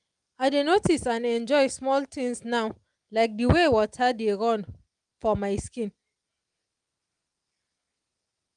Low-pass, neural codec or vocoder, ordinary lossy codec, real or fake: 9.9 kHz; none; Opus, 32 kbps; real